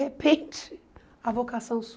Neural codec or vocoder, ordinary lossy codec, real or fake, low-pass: none; none; real; none